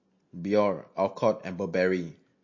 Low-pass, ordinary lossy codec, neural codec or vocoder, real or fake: 7.2 kHz; MP3, 32 kbps; none; real